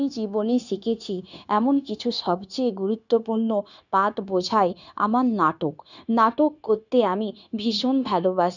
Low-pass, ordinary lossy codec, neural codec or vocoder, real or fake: 7.2 kHz; none; codec, 16 kHz, 0.9 kbps, LongCat-Audio-Codec; fake